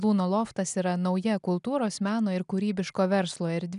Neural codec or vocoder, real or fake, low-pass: none; real; 10.8 kHz